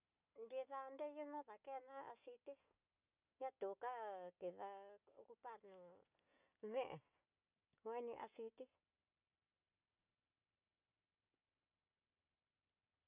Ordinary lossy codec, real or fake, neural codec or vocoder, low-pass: none; real; none; 3.6 kHz